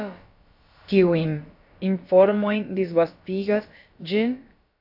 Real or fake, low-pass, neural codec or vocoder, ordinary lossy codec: fake; 5.4 kHz; codec, 16 kHz, about 1 kbps, DyCAST, with the encoder's durations; AAC, 48 kbps